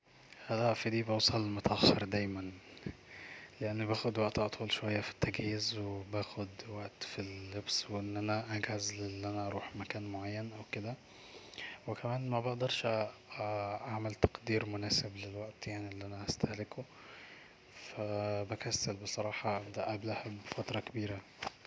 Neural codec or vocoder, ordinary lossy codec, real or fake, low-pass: none; none; real; none